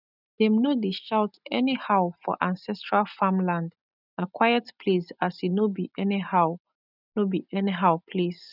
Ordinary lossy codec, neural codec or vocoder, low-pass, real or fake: none; none; 5.4 kHz; real